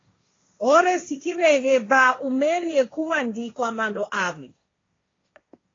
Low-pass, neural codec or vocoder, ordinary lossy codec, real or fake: 7.2 kHz; codec, 16 kHz, 1.1 kbps, Voila-Tokenizer; AAC, 32 kbps; fake